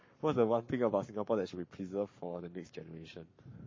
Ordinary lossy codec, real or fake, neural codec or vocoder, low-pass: MP3, 32 kbps; fake; codec, 44.1 kHz, 7.8 kbps, Pupu-Codec; 7.2 kHz